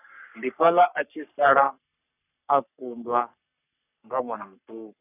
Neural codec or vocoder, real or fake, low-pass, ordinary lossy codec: codec, 44.1 kHz, 3.4 kbps, Pupu-Codec; fake; 3.6 kHz; none